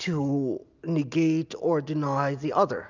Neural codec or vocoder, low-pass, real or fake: none; 7.2 kHz; real